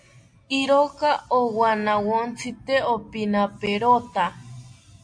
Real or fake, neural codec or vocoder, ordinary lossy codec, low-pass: real; none; AAC, 64 kbps; 9.9 kHz